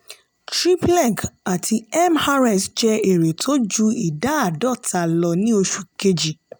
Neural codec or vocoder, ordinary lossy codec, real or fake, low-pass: none; none; real; none